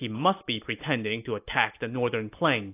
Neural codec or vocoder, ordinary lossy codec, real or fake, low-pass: none; AAC, 32 kbps; real; 3.6 kHz